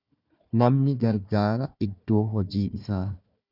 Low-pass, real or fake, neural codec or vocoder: 5.4 kHz; fake; codec, 16 kHz, 1 kbps, FunCodec, trained on Chinese and English, 50 frames a second